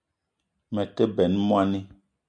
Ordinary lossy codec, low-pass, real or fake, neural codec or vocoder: MP3, 96 kbps; 9.9 kHz; real; none